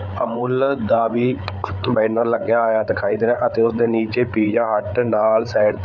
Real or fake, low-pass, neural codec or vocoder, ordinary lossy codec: fake; none; codec, 16 kHz, 8 kbps, FreqCodec, larger model; none